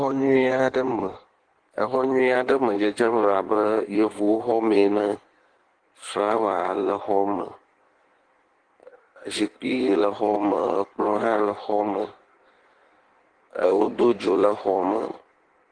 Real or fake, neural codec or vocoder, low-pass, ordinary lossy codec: fake; codec, 16 kHz in and 24 kHz out, 1.1 kbps, FireRedTTS-2 codec; 9.9 kHz; Opus, 16 kbps